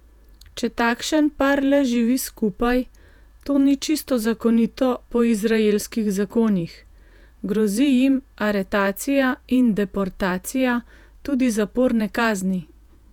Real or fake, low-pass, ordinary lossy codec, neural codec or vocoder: fake; 19.8 kHz; none; vocoder, 48 kHz, 128 mel bands, Vocos